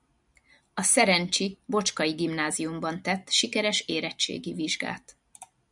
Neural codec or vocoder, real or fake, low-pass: none; real; 10.8 kHz